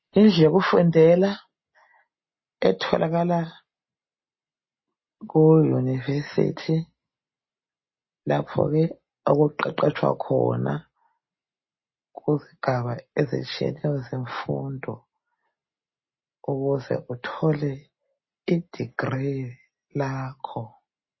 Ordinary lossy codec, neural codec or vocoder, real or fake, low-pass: MP3, 24 kbps; none; real; 7.2 kHz